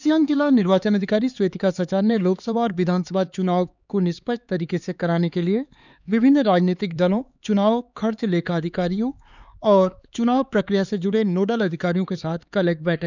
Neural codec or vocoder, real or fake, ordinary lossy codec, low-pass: codec, 16 kHz, 4 kbps, X-Codec, HuBERT features, trained on LibriSpeech; fake; none; 7.2 kHz